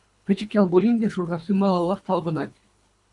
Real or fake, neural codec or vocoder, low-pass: fake; codec, 24 kHz, 3 kbps, HILCodec; 10.8 kHz